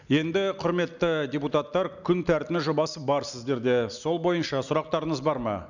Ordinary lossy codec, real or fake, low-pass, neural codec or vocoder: none; real; 7.2 kHz; none